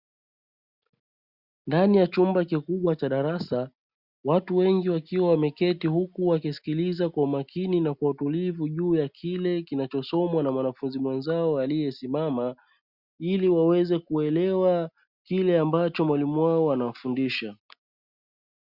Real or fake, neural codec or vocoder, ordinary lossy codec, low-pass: real; none; Opus, 64 kbps; 5.4 kHz